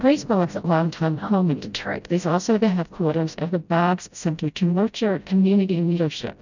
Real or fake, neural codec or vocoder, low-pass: fake; codec, 16 kHz, 0.5 kbps, FreqCodec, smaller model; 7.2 kHz